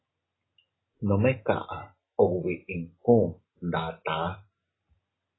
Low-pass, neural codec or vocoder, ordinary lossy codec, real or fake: 7.2 kHz; none; AAC, 16 kbps; real